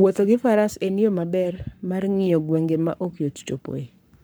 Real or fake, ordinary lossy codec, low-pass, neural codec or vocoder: fake; none; none; codec, 44.1 kHz, 7.8 kbps, Pupu-Codec